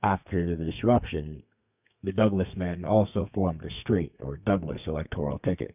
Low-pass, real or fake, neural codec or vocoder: 3.6 kHz; fake; codec, 32 kHz, 1.9 kbps, SNAC